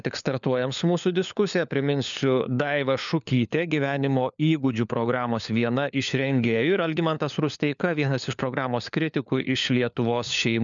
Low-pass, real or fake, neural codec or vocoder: 7.2 kHz; fake; codec, 16 kHz, 4 kbps, FunCodec, trained on LibriTTS, 50 frames a second